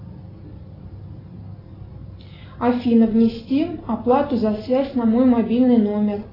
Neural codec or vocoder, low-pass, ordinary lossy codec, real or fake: none; 5.4 kHz; AAC, 24 kbps; real